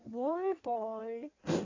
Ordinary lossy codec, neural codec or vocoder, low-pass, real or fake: AAC, 32 kbps; codec, 16 kHz in and 24 kHz out, 1.1 kbps, FireRedTTS-2 codec; 7.2 kHz; fake